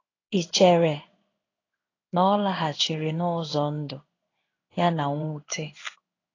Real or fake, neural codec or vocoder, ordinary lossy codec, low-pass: fake; codec, 16 kHz in and 24 kHz out, 1 kbps, XY-Tokenizer; AAC, 32 kbps; 7.2 kHz